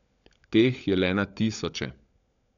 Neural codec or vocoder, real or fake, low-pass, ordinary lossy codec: codec, 16 kHz, 16 kbps, FunCodec, trained on LibriTTS, 50 frames a second; fake; 7.2 kHz; none